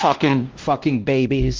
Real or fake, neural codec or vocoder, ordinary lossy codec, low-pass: fake; codec, 16 kHz, 1 kbps, X-Codec, WavLM features, trained on Multilingual LibriSpeech; Opus, 16 kbps; 7.2 kHz